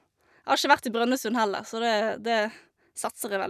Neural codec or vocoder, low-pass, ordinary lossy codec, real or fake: none; 14.4 kHz; none; real